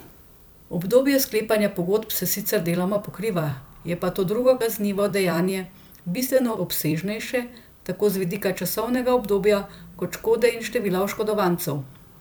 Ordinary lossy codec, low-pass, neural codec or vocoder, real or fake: none; none; vocoder, 44.1 kHz, 128 mel bands every 256 samples, BigVGAN v2; fake